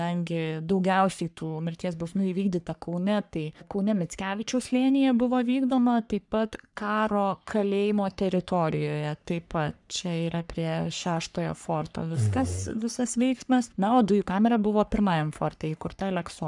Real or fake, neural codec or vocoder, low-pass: fake; codec, 44.1 kHz, 3.4 kbps, Pupu-Codec; 10.8 kHz